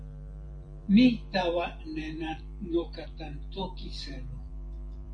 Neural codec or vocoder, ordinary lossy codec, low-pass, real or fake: vocoder, 44.1 kHz, 128 mel bands every 512 samples, BigVGAN v2; MP3, 48 kbps; 9.9 kHz; fake